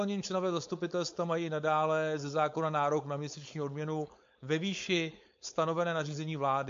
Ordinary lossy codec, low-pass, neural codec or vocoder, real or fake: MP3, 48 kbps; 7.2 kHz; codec, 16 kHz, 4.8 kbps, FACodec; fake